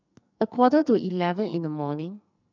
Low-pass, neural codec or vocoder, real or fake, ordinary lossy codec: 7.2 kHz; codec, 44.1 kHz, 2.6 kbps, SNAC; fake; none